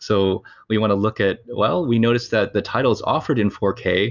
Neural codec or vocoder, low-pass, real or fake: none; 7.2 kHz; real